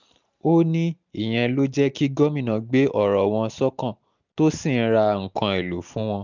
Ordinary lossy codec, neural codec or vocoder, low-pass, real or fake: none; none; 7.2 kHz; real